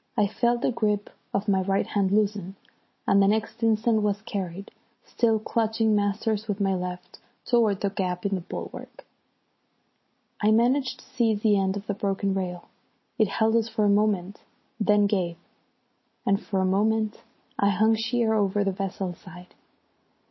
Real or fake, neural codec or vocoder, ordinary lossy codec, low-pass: real; none; MP3, 24 kbps; 7.2 kHz